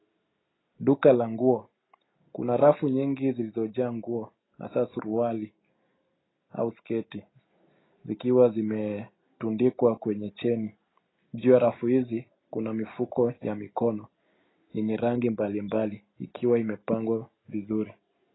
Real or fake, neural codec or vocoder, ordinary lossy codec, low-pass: real; none; AAC, 16 kbps; 7.2 kHz